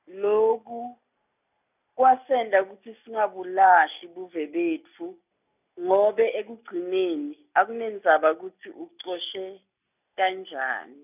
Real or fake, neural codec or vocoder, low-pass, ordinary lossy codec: real; none; 3.6 kHz; none